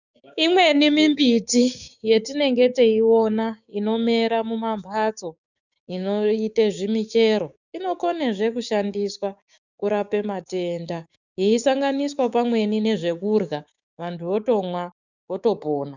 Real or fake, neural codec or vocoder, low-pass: fake; codec, 16 kHz, 6 kbps, DAC; 7.2 kHz